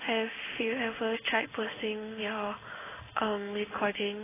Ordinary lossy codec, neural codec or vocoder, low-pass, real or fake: AAC, 16 kbps; codec, 16 kHz in and 24 kHz out, 1 kbps, XY-Tokenizer; 3.6 kHz; fake